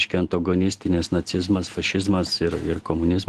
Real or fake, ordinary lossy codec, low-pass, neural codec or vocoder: real; Opus, 16 kbps; 10.8 kHz; none